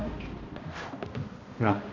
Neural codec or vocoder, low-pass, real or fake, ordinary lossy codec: codec, 16 kHz, 1 kbps, X-Codec, HuBERT features, trained on balanced general audio; 7.2 kHz; fake; AAC, 48 kbps